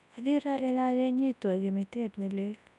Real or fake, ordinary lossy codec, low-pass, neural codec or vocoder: fake; none; 10.8 kHz; codec, 24 kHz, 0.9 kbps, WavTokenizer, large speech release